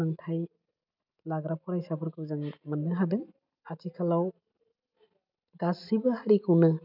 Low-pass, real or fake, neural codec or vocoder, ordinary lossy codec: 5.4 kHz; real; none; none